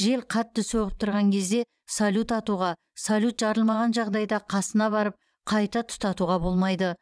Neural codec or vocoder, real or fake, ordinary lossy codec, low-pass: vocoder, 22.05 kHz, 80 mel bands, WaveNeXt; fake; none; none